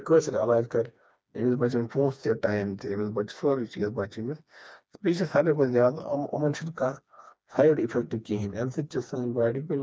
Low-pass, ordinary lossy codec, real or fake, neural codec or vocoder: none; none; fake; codec, 16 kHz, 2 kbps, FreqCodec, smaller model